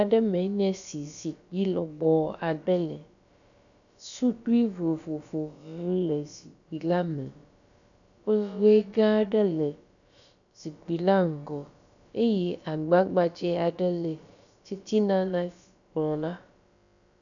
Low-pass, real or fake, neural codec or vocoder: 7.2 kHz; fake; codec, 16 kHz, about 1 kbps, DyCAST, with the encoder's durations